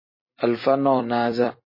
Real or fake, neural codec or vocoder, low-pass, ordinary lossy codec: fake; vocoder, 44.1 kHz, 128 mel bands every 256 samples, BigVGAN v2; 5.4 kHz; MP3, 24 kbps